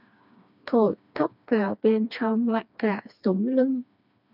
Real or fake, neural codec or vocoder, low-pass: fake; codec, 16 kHz, 2 kbps, FreqCodec, smaller model; 5.4 kHz